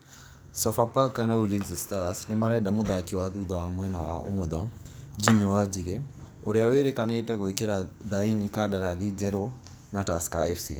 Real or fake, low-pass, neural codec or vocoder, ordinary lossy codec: fake; none; codec, 44.1 kHz, 2.6 kbps, SNAC; none